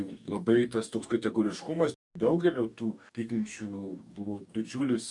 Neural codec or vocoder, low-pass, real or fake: codec, 44.1 kHz, 2.6 kbps, DAC; 10.8 kHz; fake